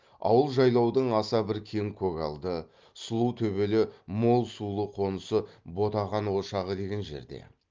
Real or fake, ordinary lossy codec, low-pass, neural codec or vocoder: real; Opus, 32 kbps; 7.2 kHz; none